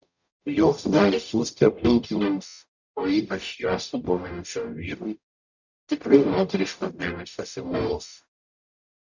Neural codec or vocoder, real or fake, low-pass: codec, 44.1 kHz, 0.9 kbps, DAC; fake; 7.2 kHz